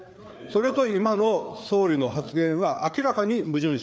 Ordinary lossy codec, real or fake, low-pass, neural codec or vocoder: none; fake; none; codec, 16 kHz, 4 kbps, FreqCodec, larger model